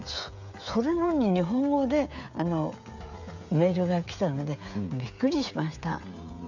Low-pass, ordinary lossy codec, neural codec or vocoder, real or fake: 7.2 kHz; none; codec, 16 kHz, 16 kbps, FreqCodec, smaller model; fake